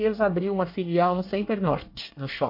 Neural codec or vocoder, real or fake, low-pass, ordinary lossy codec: codec, 24 kHz, 1 kbps, SNAC; fake; 5.4 kHz; MP3, 32 kbps